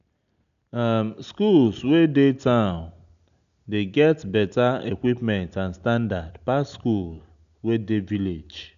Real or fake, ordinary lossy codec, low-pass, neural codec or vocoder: real; none; 7.2 kHz; none